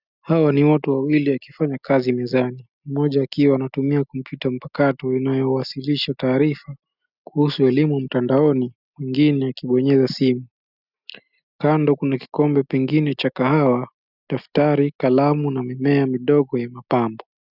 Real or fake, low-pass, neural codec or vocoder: real; 5.4 kHz; none